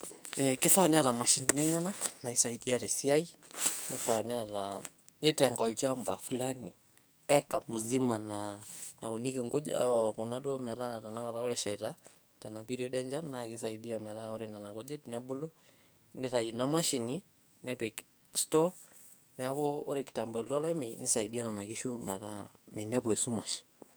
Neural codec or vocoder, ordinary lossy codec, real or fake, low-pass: codec, 44.1 kHz, 2.6 kbps, SNAC; none; fake; none